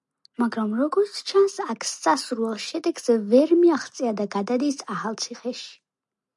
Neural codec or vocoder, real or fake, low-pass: none; real; 10.8 kHz